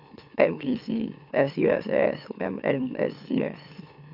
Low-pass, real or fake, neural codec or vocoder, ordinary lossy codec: 5.4 kHz; fake; autoencoder, 44.1 kHz, a latent of 192 numbers a frame, MeloTTS; none